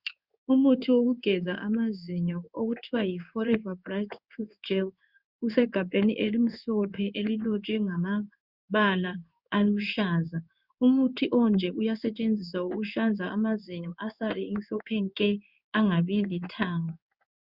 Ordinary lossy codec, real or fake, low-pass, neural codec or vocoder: Opus, 64 kbps; fake; 5.4 kHz; codec, 16 kHz in and 24 kHz out, 1 kbps, XY-Tokenizer